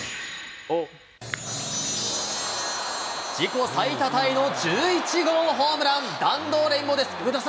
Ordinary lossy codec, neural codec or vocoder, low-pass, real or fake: none; none; none; real